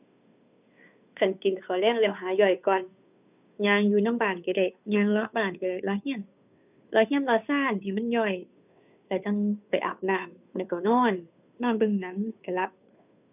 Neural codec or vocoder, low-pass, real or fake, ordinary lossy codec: codec, 16 kHz, 2 kbps, FunCodec, trained on Chinese and English, 25 frames a second; 3.6 kHz; fake; none